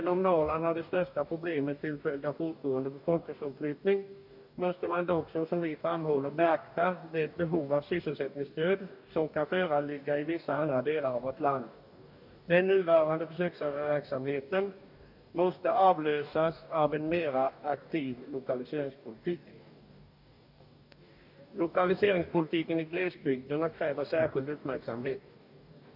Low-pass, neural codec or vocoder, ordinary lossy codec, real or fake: 5.4 kHz; codec, 44.1 kHz, 2.6 kbps, DAC; none; fake